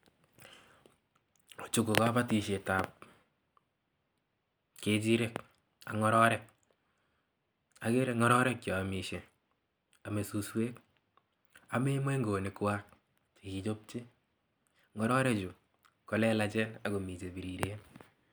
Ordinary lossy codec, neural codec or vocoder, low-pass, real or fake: none; none; none; real